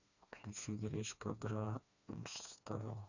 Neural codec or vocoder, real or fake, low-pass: codec, 16 kHz, 2 kbps, FreqCodec, smaller model; fake; 7.2 kHz